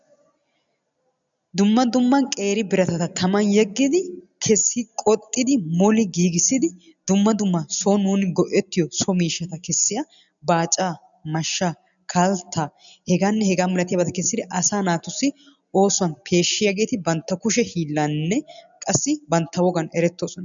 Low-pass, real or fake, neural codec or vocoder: 7.2 kHz; real; none